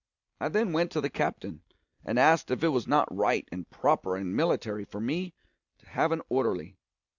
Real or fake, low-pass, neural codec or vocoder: real; 7.2 kHz; none